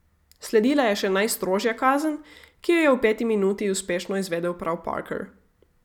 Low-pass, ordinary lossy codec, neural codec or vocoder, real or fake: 19.8 kHz; none; none; real